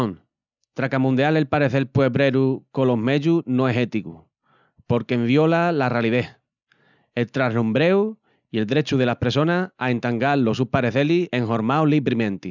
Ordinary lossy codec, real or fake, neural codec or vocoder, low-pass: none; real; none; 7.2 kHz